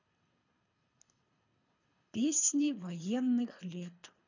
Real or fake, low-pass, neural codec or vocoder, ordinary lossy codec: fake; 7.2 kHz; codec, 24 kHz, 3 kbps, HILCodec; none